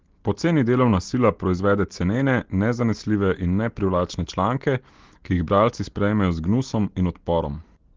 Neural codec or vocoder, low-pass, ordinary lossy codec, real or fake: none; 7.2 kHz; Opus, 16 kbps; real